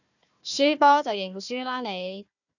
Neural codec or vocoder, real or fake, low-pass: codec, 16 kHz, 1 kbps, FunCodec, trained on Chinese and English, 50 frames a second; fake; 7.2 kHz